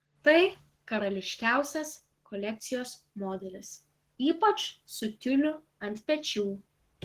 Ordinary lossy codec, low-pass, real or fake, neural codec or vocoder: Opus, 16 kbps; 14.4 kHz; fake; codec, 44.1 kHz, 7.8 kbps, Pupu-Codec